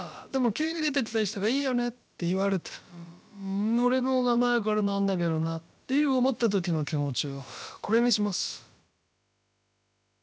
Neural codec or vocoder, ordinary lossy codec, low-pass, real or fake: codec, 16 kHz, about 1 kbps, DyCAST, with the encoder's durations; none; none; fake